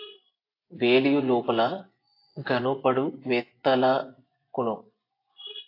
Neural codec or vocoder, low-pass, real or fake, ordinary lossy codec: vocoder, 24 kHz, 100 mel bands, Vocos; 5.4 kHz; fake; AAC, 32 kbps